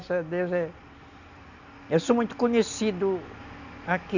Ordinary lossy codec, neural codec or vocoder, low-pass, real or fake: none; none; 7.2 kHz; real